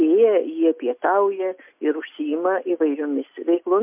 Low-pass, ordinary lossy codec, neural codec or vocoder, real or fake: 3.6 kHz; MP3, 32 kbps; none; real